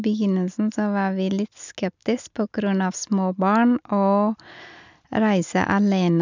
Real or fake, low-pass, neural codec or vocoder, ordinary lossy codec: real; 7.2 kHz; none; none